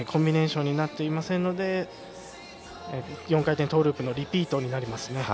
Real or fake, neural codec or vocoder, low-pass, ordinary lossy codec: real; none; none; none